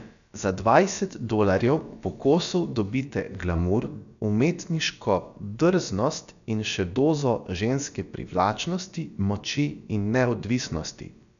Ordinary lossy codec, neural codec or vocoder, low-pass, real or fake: none; codec, 16 kHz, about 1 kbps, DyCAST, with the encoder's durations; 7.2 kHz; fake